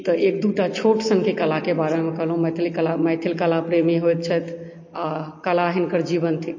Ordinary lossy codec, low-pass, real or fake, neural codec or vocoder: MP3, 32 kbps; 7.2 kHz; real; none